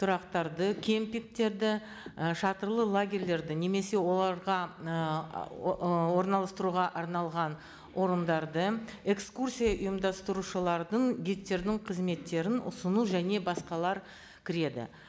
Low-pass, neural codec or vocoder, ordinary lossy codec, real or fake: none; none; none; real